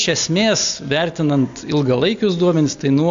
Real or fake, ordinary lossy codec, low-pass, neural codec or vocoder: real; AAC, 64 kbps; 7.2 kHz; none